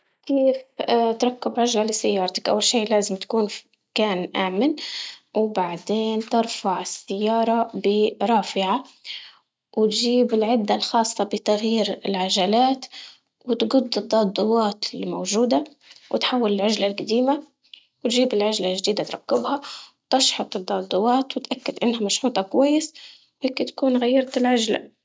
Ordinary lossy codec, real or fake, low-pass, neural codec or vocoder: none; real; none; none